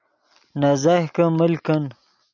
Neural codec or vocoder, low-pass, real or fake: none; 7.2 kHz; real